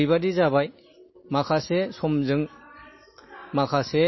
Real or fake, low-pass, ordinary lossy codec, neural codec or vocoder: real; 7.2 kHz; MP3, 24 kbps; none